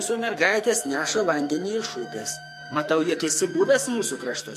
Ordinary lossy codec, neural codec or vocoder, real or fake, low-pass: MP3, 64 kbps; codec, 44.1 kHz, 2.6 kbps, SNAC; fake; 14.4 kHz